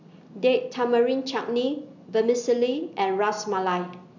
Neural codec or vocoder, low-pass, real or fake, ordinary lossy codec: none; 7.2 kHz; real; none